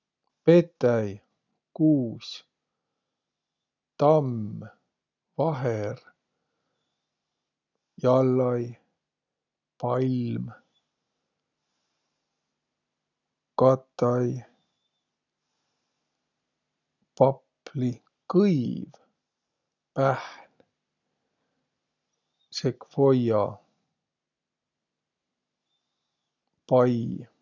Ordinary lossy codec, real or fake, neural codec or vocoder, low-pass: none; real; none; 7.2 kHz